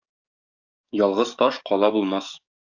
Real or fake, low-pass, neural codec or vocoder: fake; 7.2 kHz; codec, 44.1 kHz, 7.8 kbps, DAC